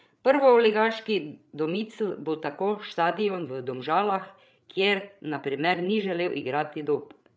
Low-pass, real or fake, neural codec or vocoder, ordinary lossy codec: none; fake; codec, 16 kHz, 8 kbps, FreqCodec, larger model; none